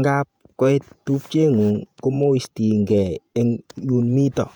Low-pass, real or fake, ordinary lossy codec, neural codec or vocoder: 19.8 kHz; fake; none; vocoder, 48 kHz, 128 mel bands, Vocos